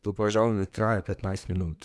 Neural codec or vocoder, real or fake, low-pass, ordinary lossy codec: codec, 24 kHz, 1 kbps, SNAC; fake; none; none